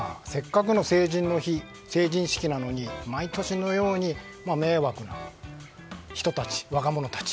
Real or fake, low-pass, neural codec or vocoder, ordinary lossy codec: real; none; none; none